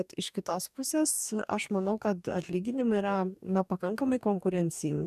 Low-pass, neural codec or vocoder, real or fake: 14.4 kHz; codec, 44.1 kHz, 2.6 kbps, DAC; fake